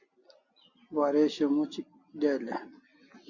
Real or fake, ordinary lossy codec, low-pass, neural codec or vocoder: real; Opus, 64 kbps; 7.2 kHz; none